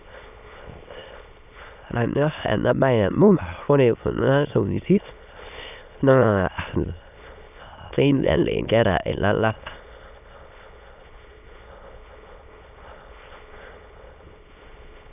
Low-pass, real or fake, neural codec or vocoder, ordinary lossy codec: 3.6 kHz; fake; autoencoder, 22.05 kHz, a latent of 192 numbers a frame, VITS, trained on many speakers; none